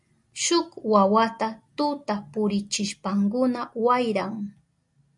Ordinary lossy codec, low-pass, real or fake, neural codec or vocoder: MP3, 96 kbps; 10.8 kHz; real; none